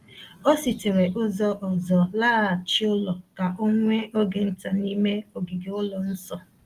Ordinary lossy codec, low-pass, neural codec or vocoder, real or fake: Opus, 24 kbps; 14.4 kHz; none; real